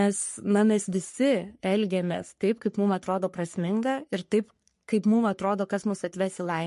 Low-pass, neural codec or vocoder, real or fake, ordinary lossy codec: 14.4 kHz; codec, 44.1 kHz, 3.4 kbps, Pupu-Codec; fake; MP3, 48 kbps